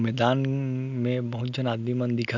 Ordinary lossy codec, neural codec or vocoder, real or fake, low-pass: none; none; real; 7.2 kHz